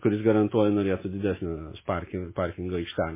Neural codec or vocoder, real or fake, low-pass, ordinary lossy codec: autoencoder, 48 kHz, 128 numbers a frame, DAC-VAE, trained on Japanese speech; fake; 3.6 kHz; MP3, 16 kbps